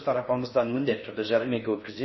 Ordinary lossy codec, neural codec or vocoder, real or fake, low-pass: MP3, 24 kbps; codec, 16 kHz in and 24 kHz out, 0.6 kbps, FocalCodec, streaming, 4096 codes; fake; 7.2 kHz